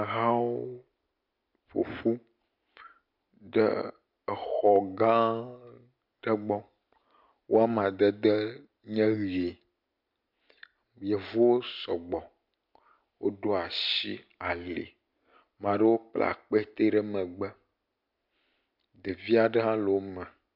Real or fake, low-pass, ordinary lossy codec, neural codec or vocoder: real; 5.4 kHz; MP3, 48 kbps; none